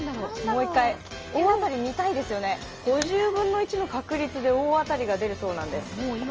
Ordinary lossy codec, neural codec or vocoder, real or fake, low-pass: Opus, 24 kbps; none; real; 7.2 kHz